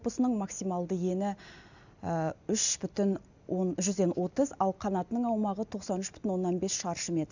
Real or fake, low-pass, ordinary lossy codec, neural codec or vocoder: real; 7.2 kHz; none; none